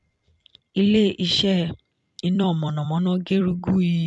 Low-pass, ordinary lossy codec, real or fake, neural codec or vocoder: 10.8 kHz; none; fake; vocoder, 44.1 kHz, 128 mel bands every 256 samples, BigVGAN v2